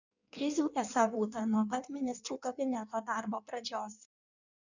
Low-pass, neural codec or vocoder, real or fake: 7.2 kHz; codec, 16 kHz in and 24 kHz out, 1.1 kbps, FireRedTTS-2 codec; fake